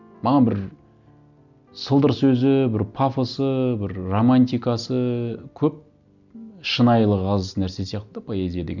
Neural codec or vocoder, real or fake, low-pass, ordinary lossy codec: none; real; 7.2 kHz; none